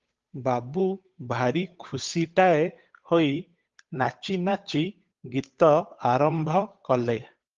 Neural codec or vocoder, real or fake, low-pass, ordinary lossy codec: codec, 16 kHz, 8 kbps, FunCodec, trained on Chinese and English, 25 frames a second; fake; 7.2 kHz; Opus, 32 kbps